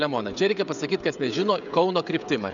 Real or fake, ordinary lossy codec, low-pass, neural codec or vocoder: fake; MP3, 96 kbps; 7.2 kHz; codec, 16 kHz, 16 kbps, FreqCodec, smaller model